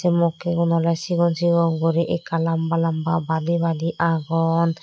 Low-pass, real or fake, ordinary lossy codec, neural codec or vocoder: none; real; none; none